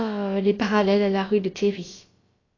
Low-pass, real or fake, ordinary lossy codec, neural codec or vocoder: 7.2 kHz; fake; AAC, 32 kbps; codec, 16 kHz, about 1 kbps, DyCAST, with the encoder's durations